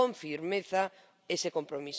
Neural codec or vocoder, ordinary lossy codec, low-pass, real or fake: none; none; none; real